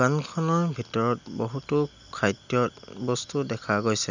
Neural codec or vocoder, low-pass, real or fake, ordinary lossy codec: none; 7.2 kHz; real; none